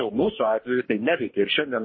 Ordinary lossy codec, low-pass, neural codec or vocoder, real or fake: MP3, 24 kbps; 7.2 kHz; codec, 44.1 kHz, 2.6 kbps, DAC; fake